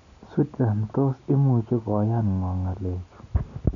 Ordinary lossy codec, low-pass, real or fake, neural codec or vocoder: none; 7.2 kHz; real; none